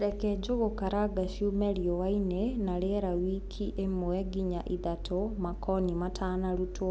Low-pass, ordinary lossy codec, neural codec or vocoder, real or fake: none; none; none; real